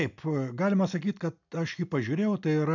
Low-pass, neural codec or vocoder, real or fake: 7.2 kHz; none; real